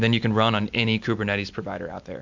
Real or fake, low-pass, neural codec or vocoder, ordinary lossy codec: real; 7.2 kHz; none; MP3, 64 kbps